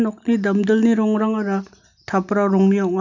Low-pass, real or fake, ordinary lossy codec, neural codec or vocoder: 7.2 kHz; real; none; none